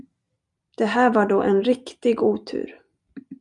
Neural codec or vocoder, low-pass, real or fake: none; 10.8 kHz; real